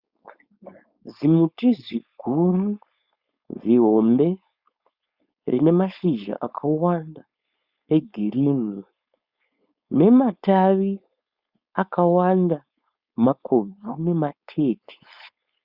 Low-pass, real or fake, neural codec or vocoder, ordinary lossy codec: 5.4 kHz; fake; codec, 16 kHz, 4.8 kbps, FACodec; Opus, 64 kbps